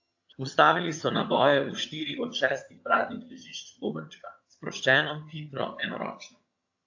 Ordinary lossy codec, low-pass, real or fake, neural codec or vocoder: AAC, 48 kbps; 7.2 kHz; fake; vocoder, 22.05 kHz, 80 mel bands, HiFi-GAN